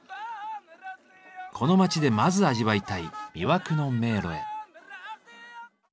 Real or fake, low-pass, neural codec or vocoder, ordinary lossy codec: real; none; none; none